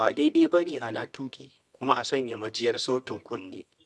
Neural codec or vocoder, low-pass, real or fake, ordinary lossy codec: codec, 24 kHz, 0.9 kbps, WavTokenizer, medium music audio release; none; fake; none